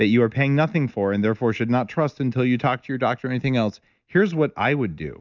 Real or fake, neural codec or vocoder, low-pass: real; none; 7.2 kHz